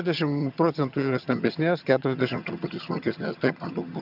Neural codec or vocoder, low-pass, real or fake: vocoder, 22.05 kHz, 80 mel bands, HiFi-GAN; 5.4 kHz; fake